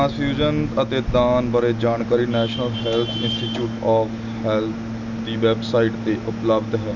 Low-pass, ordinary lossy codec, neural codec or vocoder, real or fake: 7.2 kHz; none; none; real